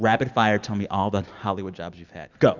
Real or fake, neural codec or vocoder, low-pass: real; none; 7.2 kHz